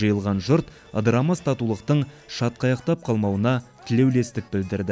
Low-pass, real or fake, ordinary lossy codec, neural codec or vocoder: none; real; none; none